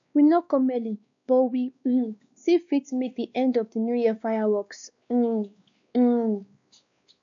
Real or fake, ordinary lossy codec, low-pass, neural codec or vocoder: fake; AAC, 64 kbps; 7.2 kHz; codec, 16 kHz, 2 kbps, X-Codec, WavLM features, trained on Multilingual LibriSpeech